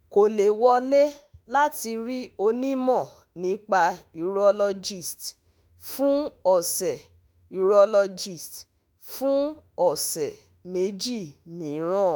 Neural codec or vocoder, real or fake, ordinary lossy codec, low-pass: autoencoder, 48 kHz, 32 numbers a frame, DAC-VAE, trained on Japanese speech; fake; none; none